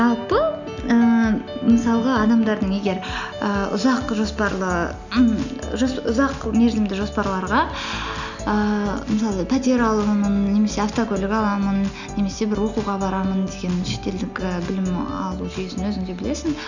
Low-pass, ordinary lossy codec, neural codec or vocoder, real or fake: 7.2 kHz; none; none; real